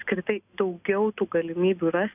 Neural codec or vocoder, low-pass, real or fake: none; 3.6 kHz; real